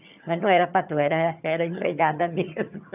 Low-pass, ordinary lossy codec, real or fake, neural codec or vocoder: 3.6 kHz; none; fake; vocoder, 22.05 kHz, 80 mel bands, HiFi-GAN